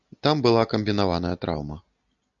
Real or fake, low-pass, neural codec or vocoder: real; 7.2 kHz; none